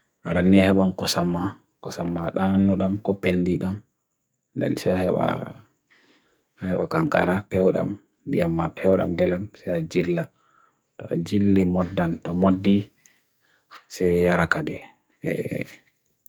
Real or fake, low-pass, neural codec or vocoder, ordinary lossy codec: fake; none; codec, 44.1 kHz, 2.6 kbps, SNAC; none